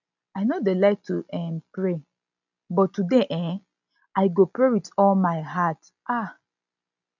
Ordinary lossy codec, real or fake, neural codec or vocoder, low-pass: none; real; none; 7.2 kHz